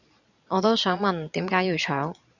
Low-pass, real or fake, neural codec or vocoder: 7.2 kHz; fake; vocoder, 22.05 kHz, 80 mel bands, Vocos